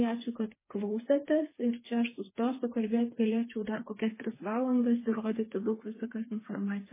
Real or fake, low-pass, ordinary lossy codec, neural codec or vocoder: fake; 3.6 kHz; MP3, 16 kbps; codec, 16 kHz, 4 kbps, FreqCodec, smaller model